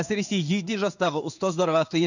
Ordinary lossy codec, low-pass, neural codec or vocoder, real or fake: none; 7.2 kHz; codec, 16 kHz in and 24 kHz out, 1 kbps, XY-Tokenizer; fake